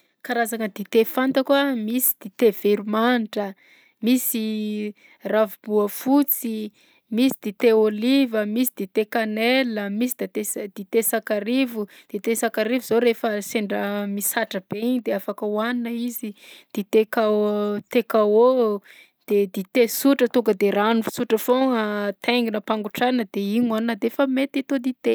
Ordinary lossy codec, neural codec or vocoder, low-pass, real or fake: none; none; none; real